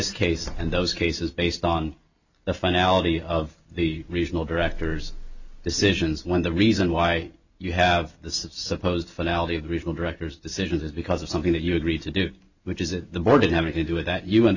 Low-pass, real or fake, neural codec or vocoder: 7.2 kHz; real; none